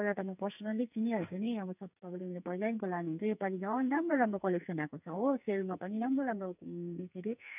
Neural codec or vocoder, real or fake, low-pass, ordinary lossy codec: codec, 44.1 kHz, 2.6 kbps, SNAC; fake; 3.6 kHz; none